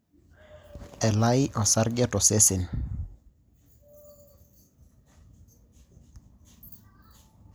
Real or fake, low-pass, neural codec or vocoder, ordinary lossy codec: real; none; none; none